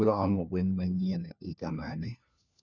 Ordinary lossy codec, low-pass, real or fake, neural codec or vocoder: none; 7.2 kHz; fake; codec, 16 kHz, 1 kbps, FunCodec, trained on LibriTTS, 50 frames a second